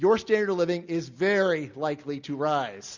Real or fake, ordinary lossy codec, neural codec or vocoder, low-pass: real; Opus, 64 kbps; none; 7.2 kHz